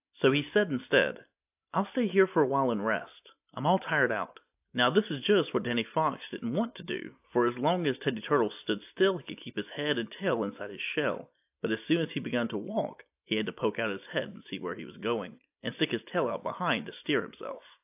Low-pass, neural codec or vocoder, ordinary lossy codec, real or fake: 3.6 kHz; none; AAC, 32 kbps; real